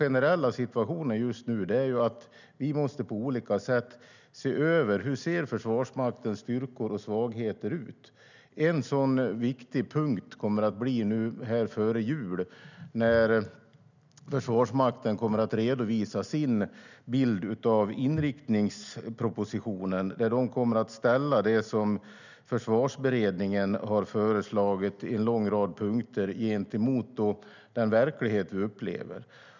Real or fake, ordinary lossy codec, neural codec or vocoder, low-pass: real; none; none; 7.2 kHz